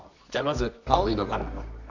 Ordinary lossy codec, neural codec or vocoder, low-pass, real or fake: none; codec, 24 kHz, 0.9 kbps, WavTokenizer, medium music audio release; 7.2 kHz; fake